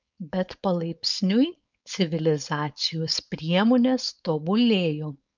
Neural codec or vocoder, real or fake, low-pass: codec, 16 kHz, 4.8 kbps, FACodec; fake; 7.2 kHz